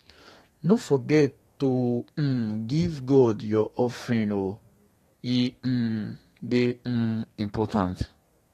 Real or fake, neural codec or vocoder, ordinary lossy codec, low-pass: fake; codec, 32 kHz, 1.9 kbps, SNAC; AAC, 48 kbps; 14.4 kHz